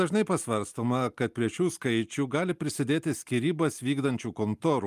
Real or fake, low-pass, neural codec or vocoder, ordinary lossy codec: real; 14.4 kHz; none; Opus, 32 kbps